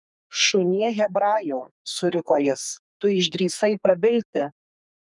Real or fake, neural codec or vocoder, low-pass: fake; codec, 32 kHz, 1.9 kbps, SNAC; 10.8 kHz